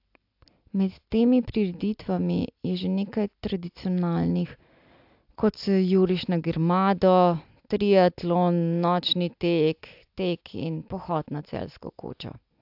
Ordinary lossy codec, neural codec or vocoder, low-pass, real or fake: AAC, 48 kbps; none; 5.4 kHz; real